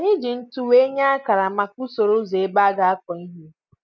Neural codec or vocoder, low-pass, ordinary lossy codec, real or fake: none; 7.2 kHz; none; real